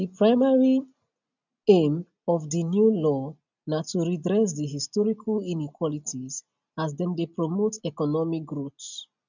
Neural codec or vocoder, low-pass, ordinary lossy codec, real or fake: none; 7.2 kHz; none; real